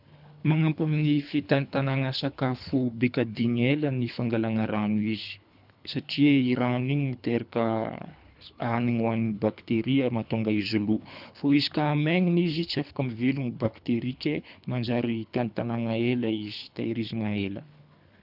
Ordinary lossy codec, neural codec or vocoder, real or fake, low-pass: none; codec, 24 kHz, 3 kbps, HILCodec; fake; 5.4 kHz